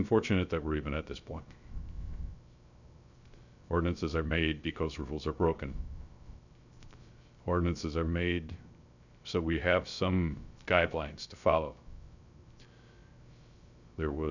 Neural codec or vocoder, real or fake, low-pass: codec, 16 kHz, 0.3 kbps, FocalCodec; fake; 7.2 kHz